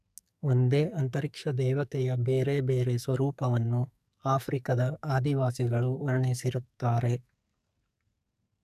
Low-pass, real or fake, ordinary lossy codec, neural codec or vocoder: 14.4 kHz; fake; none; codec, 44.1 kHz, 2.6 kbps, SNAC